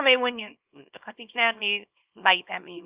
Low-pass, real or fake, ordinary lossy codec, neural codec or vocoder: 3.6 kHz; fake; Opus, 24 kbps; codec, 24 kHz, 0.9 kbps, WavTokenizer, small release